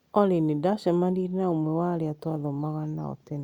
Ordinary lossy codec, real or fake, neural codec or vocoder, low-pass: Opus, 64 kbps; real; none; 19.8 kHz